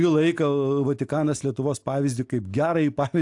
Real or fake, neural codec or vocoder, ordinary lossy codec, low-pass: real; none; AAC, 64 kbps; 10.8 kHz